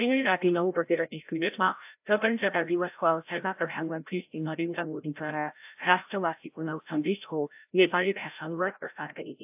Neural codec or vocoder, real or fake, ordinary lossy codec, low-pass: codec, 16 kHz, 0.5 kbps, FreqCodec, larger model; fake; AAC, 32 kbps; 3.6 kHz